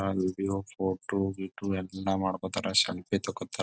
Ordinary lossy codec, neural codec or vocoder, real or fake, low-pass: none; none; real; none